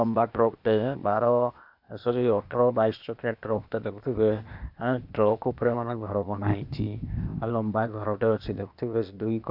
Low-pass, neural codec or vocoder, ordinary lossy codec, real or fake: 5.4 kHz; codec, 16 kHz, 0.8 kbps, ZipCodec; none; fake